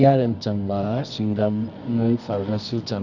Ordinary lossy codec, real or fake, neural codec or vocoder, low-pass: Opus, 64 kbps; fake; codec, 24 kHz, 0.9 kbps, WavTokenizer, medium music audio release; 7.2 kHz